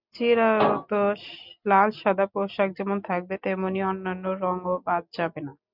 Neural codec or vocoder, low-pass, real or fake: none; 5.4 kHz; real